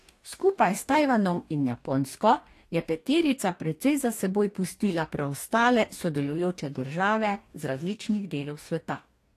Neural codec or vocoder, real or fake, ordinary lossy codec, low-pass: codec, 44.1 kHz, 2.6 kbps, DAC; fake; AAC, 64 kbps; 14.4 kHz